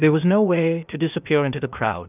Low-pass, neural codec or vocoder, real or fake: 3.6 kHz; codec, 16 kHz, 0.8 kbps, ZipCodec; fake